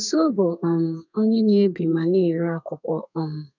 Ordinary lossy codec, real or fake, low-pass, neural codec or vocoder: none; fake; 7.2 kHz; codec, 32 kHz, 1.9 kbps, SNAC